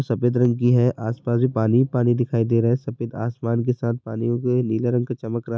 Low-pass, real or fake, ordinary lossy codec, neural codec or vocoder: none; real; none; none